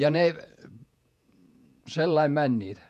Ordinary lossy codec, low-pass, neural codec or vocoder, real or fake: none; 14.4 kHz; vocoder, 48 kHz, 128 mel bands, Vocos; fake